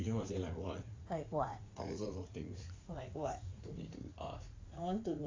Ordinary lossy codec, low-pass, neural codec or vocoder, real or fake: AAC, 32 kbps; 7.2 kHz; vocoder, 44.1 kHz, 80 mel bands, Vocos; fake